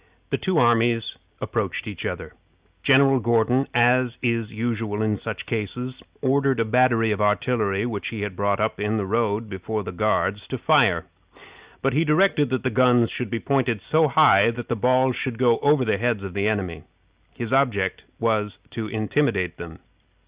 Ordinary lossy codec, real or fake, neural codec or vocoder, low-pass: Opus, 64 kbps; real; none; 3.6 kHz